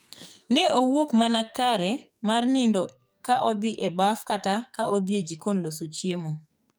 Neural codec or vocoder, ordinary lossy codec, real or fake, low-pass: codec, 44.1 kHz, 2.6 kbps, SNAC; none; fake; none